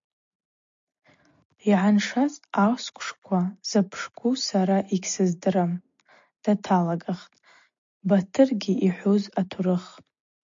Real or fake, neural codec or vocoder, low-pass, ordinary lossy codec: real; none; 7.2 kHz; MP3, 48 kbps